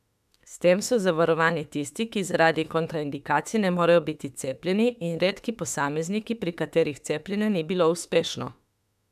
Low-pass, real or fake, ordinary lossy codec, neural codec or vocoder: 14.4 kHz; fake; none; autoencoder, 48 kHz, 32 numbers a frame, DAC-VAE, trained on Japanese speech